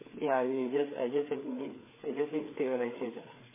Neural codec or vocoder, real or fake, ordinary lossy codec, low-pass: codec, 16 kHz, 4 kbps, FreqCodec, larger model; fake; MP3, 16 kbps; 3.6 kHz